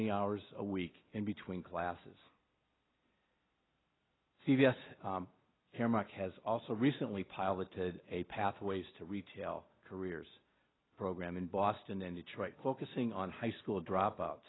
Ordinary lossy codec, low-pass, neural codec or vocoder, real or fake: AAC, 16 kbps; 7.2 kHz; none; real